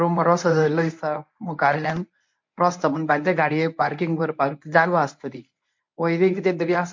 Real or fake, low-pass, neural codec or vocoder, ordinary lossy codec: fake; 7.2 kHz; codec, 24 kHz, 0.9 kbps, WavTokenizer, medium speech release version 1; MP3, 48 kbps